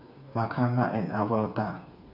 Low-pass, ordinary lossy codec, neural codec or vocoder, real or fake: 5.4 kHz; none; codec, 16 kHz, 4 kbps, FreqCodec, smaller model; fake